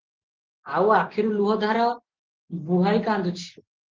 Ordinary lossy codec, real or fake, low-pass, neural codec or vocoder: Opus, 16 kbps; real; 7.2 kHz; none